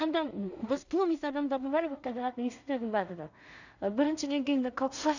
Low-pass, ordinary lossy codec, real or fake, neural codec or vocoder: 7.2 kHz; none; fake; codec, 16 kHz in and 24 kHz out, 0.4 kbps, LongCat-Audio-Codec, two codebook decoder